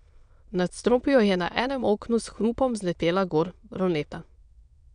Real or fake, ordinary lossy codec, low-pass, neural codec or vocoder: fake; none; 9.9 kHz; autoencoder, 22.05 kHz, a latent of 192 numbers a frame, VITS, trained on many speakers